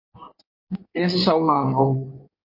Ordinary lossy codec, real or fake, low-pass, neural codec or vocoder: MP3, 32 kbps; fake; 5.4 kHz; codec, 16 kHz in and 24 kHz out, 1.1 kbps, FireRedTTS-2 codec